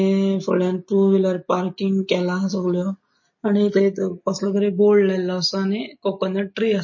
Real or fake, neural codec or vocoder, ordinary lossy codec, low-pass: real; none; MP3, 32 kbps; 7.2 kHz